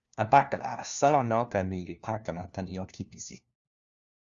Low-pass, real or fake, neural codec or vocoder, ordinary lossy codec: 7.2 kHz; fake; codec, 16 kHz, 1 kbps, FunCodec, trained on LibriTTS, 50 frames a second; Opus, 64 kbps